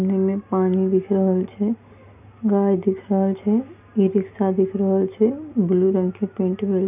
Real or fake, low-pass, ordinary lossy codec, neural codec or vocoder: real; 3.6 kHz; none; none